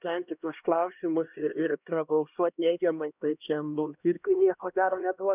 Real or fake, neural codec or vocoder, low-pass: fake; codec, 16 kHz, 1 kbps, X-Codec, HuBERT features, trained on LibriSpeech; 3.6 kHz